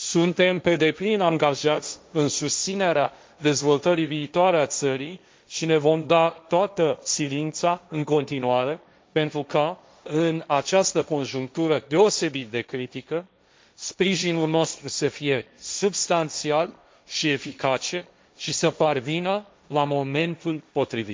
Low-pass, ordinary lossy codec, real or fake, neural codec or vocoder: none; none; fake; codec, 16 kHz, 1.1 kbps, Voila-Tokenizer